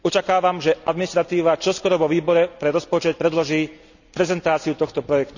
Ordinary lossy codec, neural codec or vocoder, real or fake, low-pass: none; none; real; 7.2 kHz